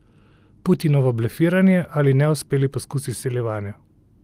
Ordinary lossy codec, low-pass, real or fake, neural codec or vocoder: Opus, 24 kbps; 14.4 kHz; real; none